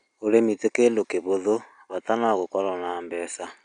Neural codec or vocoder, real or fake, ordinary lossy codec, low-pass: none; real; none; 9.9 kHz